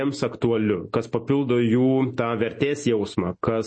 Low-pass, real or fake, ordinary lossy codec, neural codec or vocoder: 9.9 kHz; real; MP3, 32 kbps; none